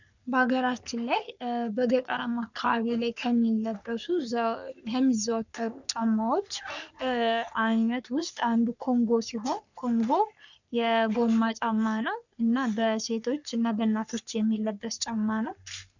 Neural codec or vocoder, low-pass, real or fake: codec, 44.1 kHz, 3.4 kbps, Pupu-Codec; 7.2 kHz; fake